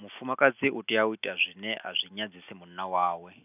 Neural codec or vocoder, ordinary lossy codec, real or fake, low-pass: none; none; real; 3.6 kHz